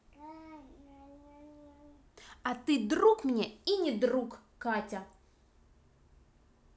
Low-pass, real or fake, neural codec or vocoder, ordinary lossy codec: none; real; none; none